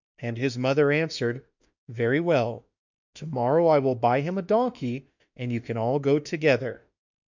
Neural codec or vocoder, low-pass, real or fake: autoencoder, 48 kHz, 32 numbers a frame, DAC-VAE, trained on Japanese speech; 7.2 kHz; fake